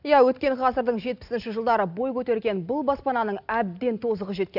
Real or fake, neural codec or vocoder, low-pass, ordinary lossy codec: real; none; 5.4 kHz; none